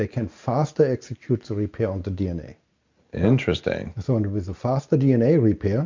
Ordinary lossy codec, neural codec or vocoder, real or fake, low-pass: MP3, 64 kbps; none; real; 7.2 kHz